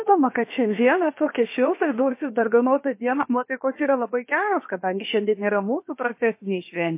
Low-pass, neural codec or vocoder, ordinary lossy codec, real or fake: 3.6 kHz; codec, 16 kHz, about 1 kbps, DyCAST, with the encoder's durations; MP3, 24 kbps; fake